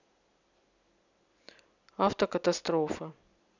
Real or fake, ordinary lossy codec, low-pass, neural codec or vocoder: real; none; 7.2 kHz; none